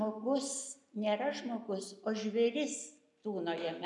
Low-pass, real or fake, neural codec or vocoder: 10.8 kHz; real; none